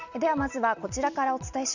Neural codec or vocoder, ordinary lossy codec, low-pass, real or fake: none; none; 7.2 kHz; real